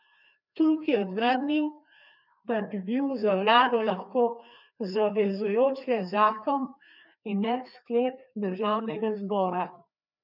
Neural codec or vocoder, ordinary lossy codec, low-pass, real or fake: codec, 16 kHz, 2 kbps, FreqCodec, larger model; none; 5.4 kHz; fake